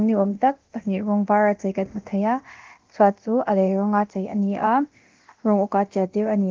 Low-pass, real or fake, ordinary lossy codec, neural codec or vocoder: 7.2 kHz; fake; Opus, 16 kbps; codec, 24 kHz, 0.9 kbps, DualCodec